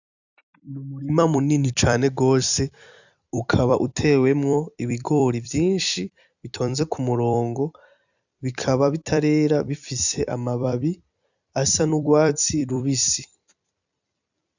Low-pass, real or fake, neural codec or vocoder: 7.2 kHz; fake; vocoder, 44.1 kHz, 128 mel bands every 256 samples, BigVGAN v2